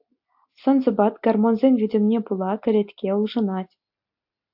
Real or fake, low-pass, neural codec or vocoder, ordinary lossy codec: real; 5.4 kHz; none; AAC, 48 kbps